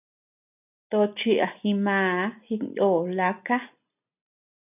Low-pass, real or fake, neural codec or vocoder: 3.6 kHz; real; none